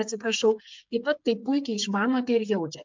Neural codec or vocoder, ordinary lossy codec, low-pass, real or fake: codec, 16 kHz, 8 kbps, FreqCodec, smaller model; MP3, 64 kbps; 7.2 kHz; fake